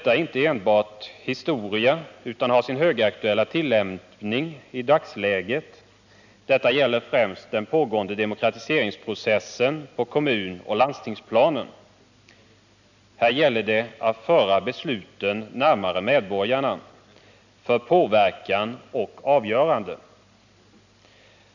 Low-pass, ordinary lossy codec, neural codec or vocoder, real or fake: 7.2 kHz; none; none; real